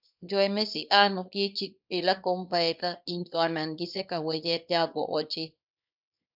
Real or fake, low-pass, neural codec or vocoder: fake; 5.4 kHz; codec, 24 kHz, 0.9 kbps, WavTokenizer, small release